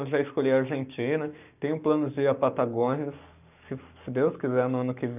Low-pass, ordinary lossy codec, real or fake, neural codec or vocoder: 3.6 kHz; none; fake; codec, 44.1 kHz, 7.8 kbps, Pupu-Codec